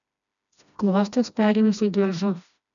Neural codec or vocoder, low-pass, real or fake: codec, 16 kHz, 1 kbps, FreqCodec, smaller model; 7.2 kHz; fake